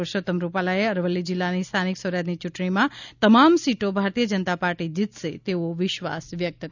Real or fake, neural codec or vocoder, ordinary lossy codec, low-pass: real; none; none; 7.2 kHz